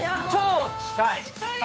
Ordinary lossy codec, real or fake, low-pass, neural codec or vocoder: none; fake; none; codec, 16 kHz, 8 kbps, FunCodec, trained on Chinese and English, 25 frames a second